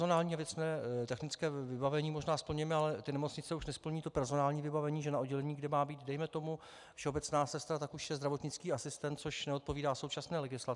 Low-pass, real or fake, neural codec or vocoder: 10.8 kHz; real; none